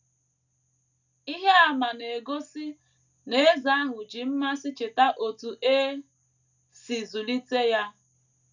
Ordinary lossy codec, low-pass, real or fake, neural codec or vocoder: none; 7.2 kHz; real; none